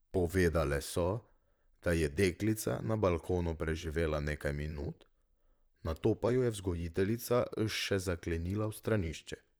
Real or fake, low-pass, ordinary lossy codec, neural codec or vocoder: fake; none; none; vocoder, 44.1 kHz, 128 mel bands, Pupu-Vocoder